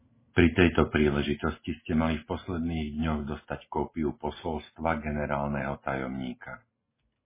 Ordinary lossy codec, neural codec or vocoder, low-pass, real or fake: MP3, 16 kbps; none; 3.6 kHz; real